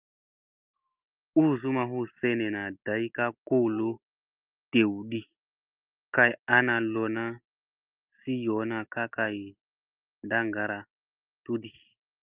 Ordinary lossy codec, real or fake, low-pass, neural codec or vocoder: Opus, 24 kbps; real; 3.6 kHz; none